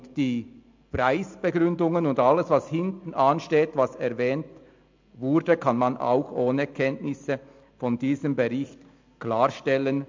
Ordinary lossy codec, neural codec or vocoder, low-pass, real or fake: MP3, 64 kbps; none; 7.2 kHz; real